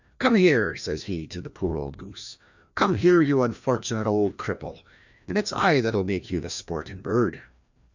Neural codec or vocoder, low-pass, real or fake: codec, 16 kHz, 1 kbps, FreqCodec, larger model; 7.2 kHz; fake